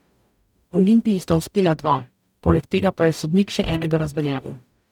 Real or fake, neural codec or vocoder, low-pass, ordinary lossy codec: fake; codec, 44.1 kHz, 0.9 kbps, DAC; 19.8 kHz; none